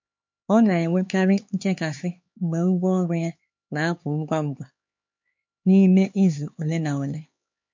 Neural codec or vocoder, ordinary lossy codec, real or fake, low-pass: codec, 16 kHz, 4 kbps, X-Codec, HuBERT features, trained on LibriSpeech; MP3, 48 kbps; fake; 7.2 kHz